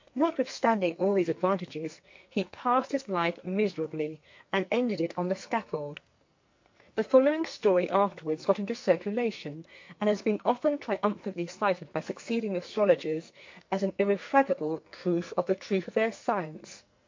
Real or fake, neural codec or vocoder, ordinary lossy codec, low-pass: fake; codec, 44.1 kHz, 2.6 kbps, SNAC; MP3, 48 kbps; 7.2 kHz